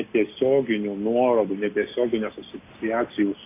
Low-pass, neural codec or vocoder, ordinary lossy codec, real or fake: 3.6 kHz; none; AAC, 24 kbps; real